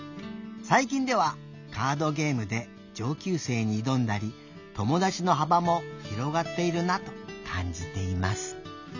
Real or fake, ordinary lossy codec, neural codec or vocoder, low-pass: real; none; none; 7.2 kHz